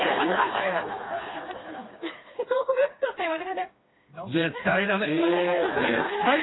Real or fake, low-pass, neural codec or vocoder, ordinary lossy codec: fake; 7.2 kHz; codec, 16 kHz, 2 kbps, FreqCodec, smaller model; AAC, 16 kbps